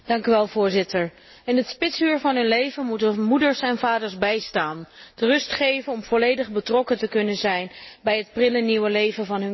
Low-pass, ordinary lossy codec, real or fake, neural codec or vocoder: 7.2 kHz; MP3, 24 kbps; real; none